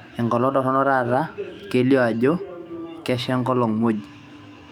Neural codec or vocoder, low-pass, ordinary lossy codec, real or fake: autoencoder, 48 kHz, 128 numbers a frame, DAC-VAE, trained on Japanese speech; 19.8 kHz; none; fake